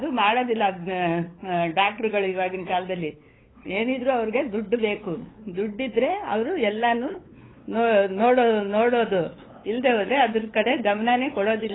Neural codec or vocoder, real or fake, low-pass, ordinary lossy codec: codec, 16 kHz, 8 kbps, FunCodec, trained on LibriTTS, 25 frames a second; fake; 7.2 kHz; AAC, 16 kbps